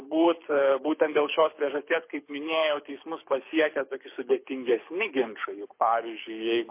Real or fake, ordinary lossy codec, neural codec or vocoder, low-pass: fake; MP3, 24 kbps; codec, 24 kHz, 6 kbps, HILCodec; 3.6 kHz